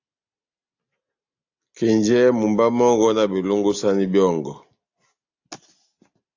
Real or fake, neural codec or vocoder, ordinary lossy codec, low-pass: real; none; AAC, 48 kbps; 7.2 kHz